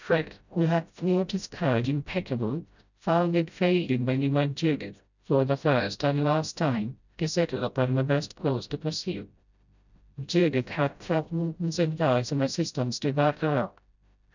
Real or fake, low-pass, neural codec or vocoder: fake; 7.2 kHz; codec, 16 kHz, 0.5 kbps, FreqCodec, smaller model